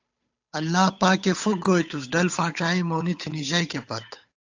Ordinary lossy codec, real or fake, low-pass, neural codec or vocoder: AAC, 48 kbps; fake; 7.2 kHz; codec, 16 kHz, 8 kbps, FunCodec, trained on Chinese and English, 25 frames a second